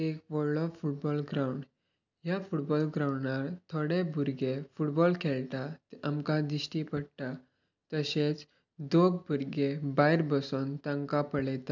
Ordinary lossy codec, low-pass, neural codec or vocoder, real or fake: none; 7.2 kHz; none; real